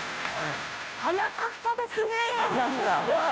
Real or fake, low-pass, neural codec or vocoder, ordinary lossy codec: fake; none; codec, 16 kHz, 0.5 kbps, FunCodec, trained on Chinese and English, 25 frames a second; none